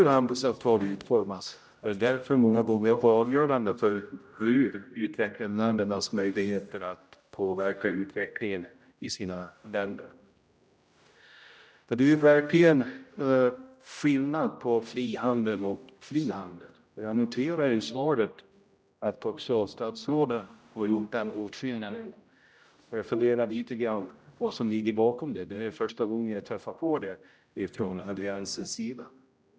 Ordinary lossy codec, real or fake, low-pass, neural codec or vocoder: none; fake; none; codec, 16 kHz, 0.5 kbps, X-Codec, HuBERT features, trained on general audio